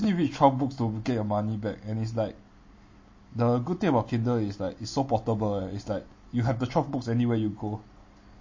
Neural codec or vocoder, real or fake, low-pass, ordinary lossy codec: none; real; 7.2 kHz; MP3, 32 kbps